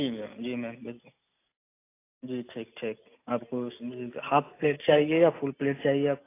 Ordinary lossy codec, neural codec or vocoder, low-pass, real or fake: AAC, 24 kbps; none; 3.6 kHz; real